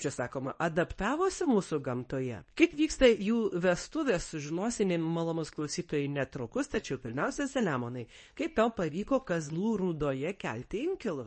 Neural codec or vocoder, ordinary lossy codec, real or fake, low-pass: codec, 24 kHz, 0.9 kbps, WavTokenizer, medium speech release version 2; MP3, 32 kbps; fake; 10.8 kHz